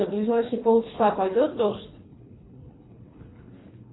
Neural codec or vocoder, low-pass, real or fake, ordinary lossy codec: codec, 24 kHz, 3 kbps, HILCodec; 7.2 kHz; fake; AAC, 16 kbps